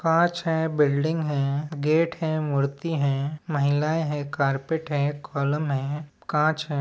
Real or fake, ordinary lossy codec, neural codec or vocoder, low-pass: real; none; none; none